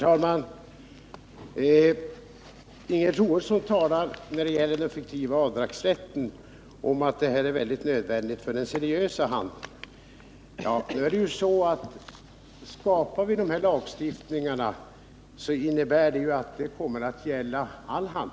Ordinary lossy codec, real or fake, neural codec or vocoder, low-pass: none; real; none; none